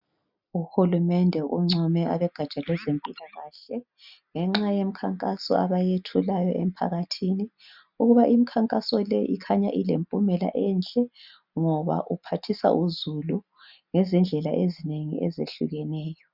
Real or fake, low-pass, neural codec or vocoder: real; 5.4 kHz; none